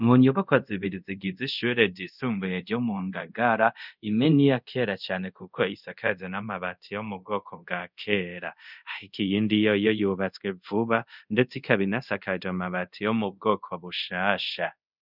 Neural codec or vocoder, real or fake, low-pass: codec, 24 kHz, 0.5 kbps, DualCodec; fake; 5.4 kHz